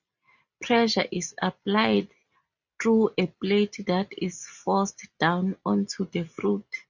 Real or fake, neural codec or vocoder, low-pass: real; none; 7.2 kHz